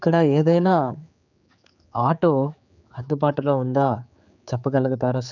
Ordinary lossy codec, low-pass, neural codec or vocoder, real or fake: none; 7.2 kHz; codec, 16 kHz, 4 kbps, X-Codec, HuBERT features, trained on general audio; fake